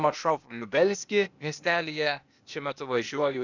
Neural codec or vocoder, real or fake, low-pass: codec, 16 kHz, 0.8 kbps, ZipCodec; fake; 7.2 kHz